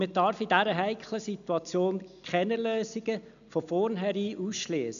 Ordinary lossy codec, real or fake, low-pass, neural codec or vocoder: none; real; 7.2 kHz; none